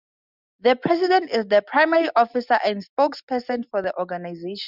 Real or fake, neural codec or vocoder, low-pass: real; none; 5.4 kHz